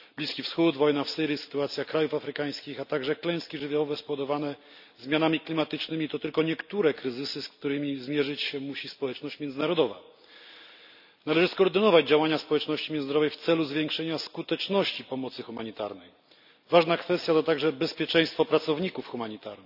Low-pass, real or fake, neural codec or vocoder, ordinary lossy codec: 5.4 kHz; real; none; none